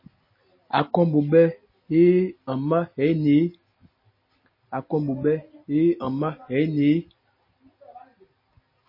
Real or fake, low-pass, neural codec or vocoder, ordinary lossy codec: real; 5.4 kHz; none; MP3, 24 kbps